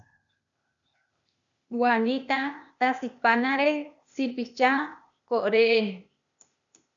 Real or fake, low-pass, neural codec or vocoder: fake; 7.2 kHz; codec, 16 kHz, 0.8 kbps, ZipCodec